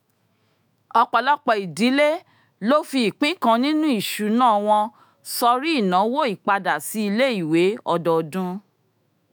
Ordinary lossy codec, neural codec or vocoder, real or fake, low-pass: none; autoencoder, 48 kHz, 128 numbers a frame, DAC-VAE, trained on Japanese speech; fake; none